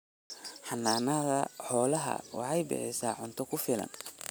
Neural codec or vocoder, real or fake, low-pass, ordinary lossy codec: none; real; none; none